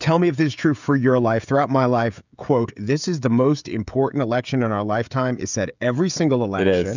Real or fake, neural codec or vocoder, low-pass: fake; codec, 16 kHz, 16 kbps, FreqCodec, smaller model; 7.2 kHz